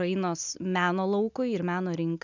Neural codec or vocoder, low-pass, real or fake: none; 7.2 kHz; real